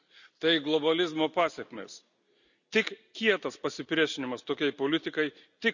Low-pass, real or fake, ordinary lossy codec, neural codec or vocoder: 7.2 kHz; real; none; none